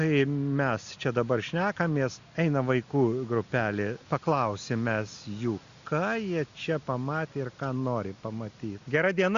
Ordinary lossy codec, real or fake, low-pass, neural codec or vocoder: Opus, 64 kbps; real; 7.2 kHz; none